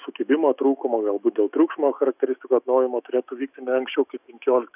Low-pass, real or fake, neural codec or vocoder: 3.6 kHz; fake; autoencoder, 48 kHz, 128 numbers a frame, DAC-VAE, trained on Japanese speech